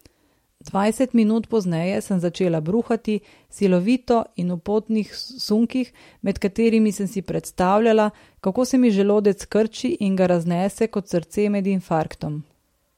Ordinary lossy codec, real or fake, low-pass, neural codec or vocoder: MP3, 64 kbps; real; 19.8 kHz; none